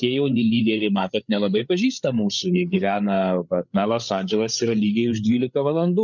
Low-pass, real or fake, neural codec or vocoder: 7.2 kHz; fake; codec, 16 kHz, 8 kbps, FreqCodec, larger model